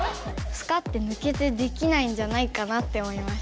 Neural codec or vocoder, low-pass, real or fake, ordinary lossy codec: none; none; real; none